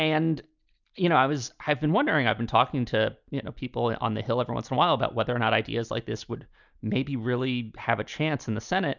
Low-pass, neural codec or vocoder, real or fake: 7.2 kHz; none; real